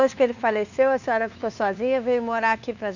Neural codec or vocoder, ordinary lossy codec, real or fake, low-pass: codec, 16 kHz, 2 kbps, FunCodec, trained on LibriTTS, 25 frames a second; none; fake; 7.2 kHz